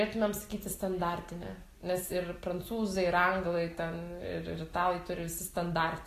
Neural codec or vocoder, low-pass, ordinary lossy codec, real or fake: none; 14.4 kHz; AAC, 48 kbps; real